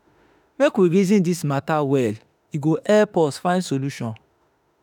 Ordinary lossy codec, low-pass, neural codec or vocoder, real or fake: none; none; autoencoder, 48 kHz, 32 numbers a frame, DAC-VAE, trained on Japanese speech; fake